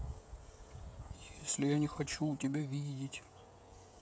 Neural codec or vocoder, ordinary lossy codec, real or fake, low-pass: codec, 16 kHz, 16 kbps, FreqCodec, smaller model; none; fake; none